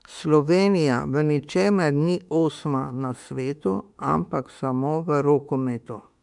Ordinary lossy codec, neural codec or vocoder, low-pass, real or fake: MP3, 96 kbps; autoencoder, 48 kHz, 32 numbers a frame, DAC-VAE, trained on Japanese speech; 10.8 kHz; fake